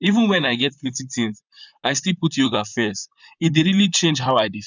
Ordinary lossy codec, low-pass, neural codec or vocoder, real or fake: none; 7.2 kHz; vocoder, 44.1 kHz, 128 mel bands, Pupu-Vocoder; fake